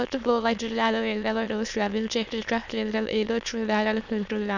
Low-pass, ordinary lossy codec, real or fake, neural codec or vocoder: 7.2 kHz; none; fake; autoencoder, 22.05 kHz, a latent of 192 numbers a frame, VITS, trained on many speakers